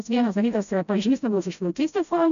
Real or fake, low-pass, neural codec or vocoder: fake; 7.2 kHz; codec, 16 kHz, 0.5 kbps, FreqCodec, smaller model